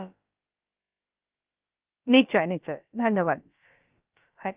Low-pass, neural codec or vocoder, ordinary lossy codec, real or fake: 3.6 kHz; codec, 16 kHz, about 1 kbps, DyCAST, with the encoder's durations; Opus, 24 kbps; fake